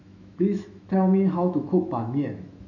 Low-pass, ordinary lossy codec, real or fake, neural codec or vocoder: 7.2 kHz; none; fake; autoencoder, 48 kHz, 128 numbers a frame, DAC-VAE, trained on Japanese speech